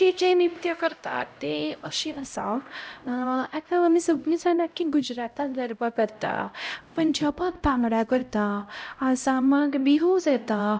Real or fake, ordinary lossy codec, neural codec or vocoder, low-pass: fake; none; codec, 16 kHz, 0.5 kbps, X-Codec, HuBERT features, trained on LibriSpeech; none